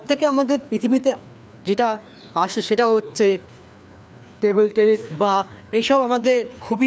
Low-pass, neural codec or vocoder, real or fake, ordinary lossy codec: none; codec, 16 kHz, 2 kbps, FreqCodec, larger model; fake; none